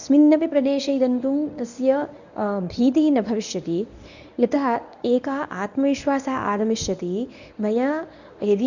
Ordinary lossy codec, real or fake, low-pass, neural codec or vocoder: none; fake; 7.2 kHz; codec, 24 kHz, 0.9 kbps, WavTokenizer, medium speech release version 1